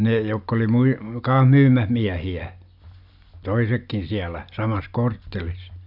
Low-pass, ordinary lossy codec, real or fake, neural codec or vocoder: 5.4 kHz; none; real; none